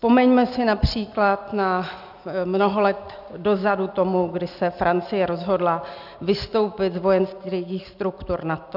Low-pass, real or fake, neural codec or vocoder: 5.4 kHz; real; none